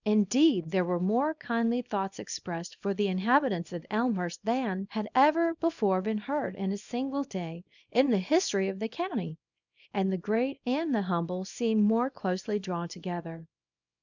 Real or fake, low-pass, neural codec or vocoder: fake; 7.2 kHz; codec, 24 kHz, 0.9 kbps, WavTokenizer, small release